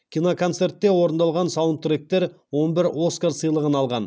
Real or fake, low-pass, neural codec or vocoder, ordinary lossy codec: real; none; none; none